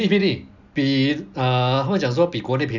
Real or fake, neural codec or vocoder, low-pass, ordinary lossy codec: real; none; 7.2 kHz; none